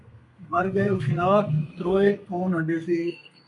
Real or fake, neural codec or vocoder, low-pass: fake; codec, 32 kHz, 1.9 kbps, SNAC; 10.8 kHz